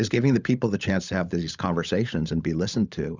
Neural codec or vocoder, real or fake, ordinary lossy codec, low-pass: codec, 16 kHz, 16 kbps, FunCodec, trained on LibriTTS, 50 frames a second; fake; Opus, 64 kbps; 7.2 kHz